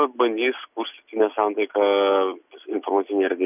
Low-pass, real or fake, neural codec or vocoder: 3.6 kHz; real; none